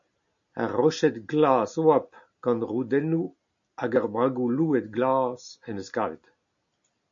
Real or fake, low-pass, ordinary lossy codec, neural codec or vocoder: real; 7.2 kHz; AAC, 64 kbps; none